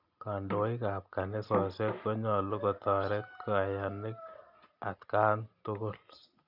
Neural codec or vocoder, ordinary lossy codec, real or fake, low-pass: none; none; real; 5.4 kHz